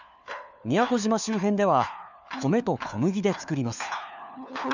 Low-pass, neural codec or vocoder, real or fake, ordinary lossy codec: 7.2 kHz; codec, 16 kHz, 4 kbps, FunCodec, trained on LibriTTS, 50 frames a second; fake; none